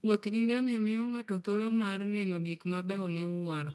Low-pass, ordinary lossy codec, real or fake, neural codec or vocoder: none; none; fake; codec, 24 kHz, 0.9 kbps, WavTokenizer, medium music audio release